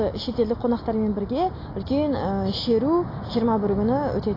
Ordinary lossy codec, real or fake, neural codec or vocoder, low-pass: AAC, 24 kbps; real; none; 5.4 kHz